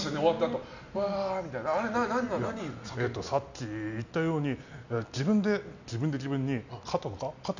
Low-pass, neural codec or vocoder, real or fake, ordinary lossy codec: 7.2 kHz; none; real; none